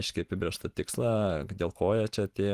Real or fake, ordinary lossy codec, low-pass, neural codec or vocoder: fake; Opus, 24 kbps; 14.4 kHz; vocoder, 44.1 kHz, 128 mel bands every 512 samples, BigVGAN v2